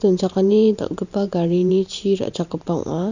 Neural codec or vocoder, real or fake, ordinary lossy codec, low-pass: vocoder, 44.1 kHz, 128 mel bands every 512 samples, BigVGAN v2; fake; none; 7.2 kHz